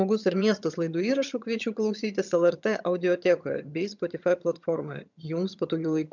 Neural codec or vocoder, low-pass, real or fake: vocoder, 22.05 kHz, 80 mel bands, HiFi-GAN; 7.2 kHz; fake